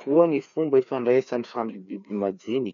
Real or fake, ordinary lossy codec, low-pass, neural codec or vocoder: fake; none; 7.2 kHz; codec, 16 kHz, 2 kbps, FreqCodec, larger model